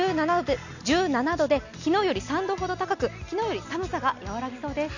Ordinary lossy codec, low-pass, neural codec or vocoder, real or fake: none; 7.2 kHz; none; real